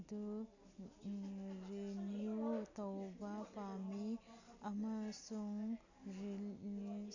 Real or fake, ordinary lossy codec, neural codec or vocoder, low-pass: real; none; none; 7.2 kHz